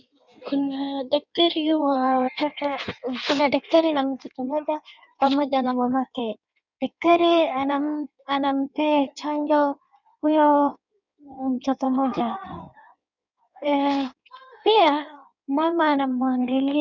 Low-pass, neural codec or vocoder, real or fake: 7.2 kHz; codec, 16 kHz in and 24 kHz out, 1.1 kbps, FireRedTTS-2 codec; fake